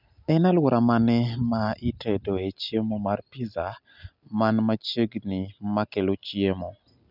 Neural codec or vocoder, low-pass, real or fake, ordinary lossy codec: none; 5.4 kHz; real; none